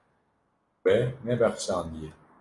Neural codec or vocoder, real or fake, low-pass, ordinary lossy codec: none; real; 10.8 kHz; AAC, 32 kbps